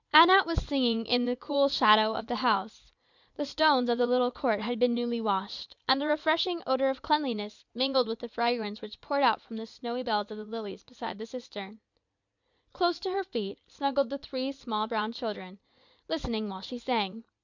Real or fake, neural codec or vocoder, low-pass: fake; vocoder, 44.1 kHz, 80 mel bands, Vocos; 7.2 kHz